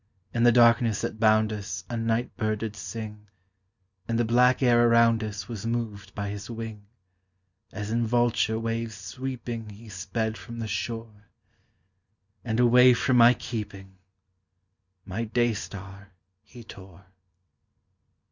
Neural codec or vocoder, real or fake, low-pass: none; real; 7.2 kHz